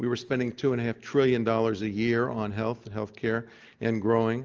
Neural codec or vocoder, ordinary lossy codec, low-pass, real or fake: none; Opus, 24 kbps; 7.2 kHz; real